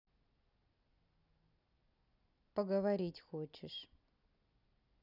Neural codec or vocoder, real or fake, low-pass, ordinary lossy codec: none; real; 5.4 kHz; none